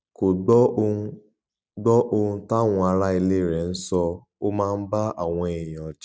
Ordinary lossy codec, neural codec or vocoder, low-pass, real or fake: none; none; none; real